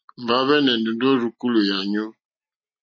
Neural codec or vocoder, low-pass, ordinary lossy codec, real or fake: none; 7.2 kHz; MP3, 32 kbps; real